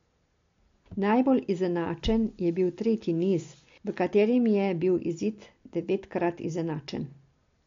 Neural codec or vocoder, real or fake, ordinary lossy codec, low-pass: none; real; MP3, 48 kbps; 7.2 kHz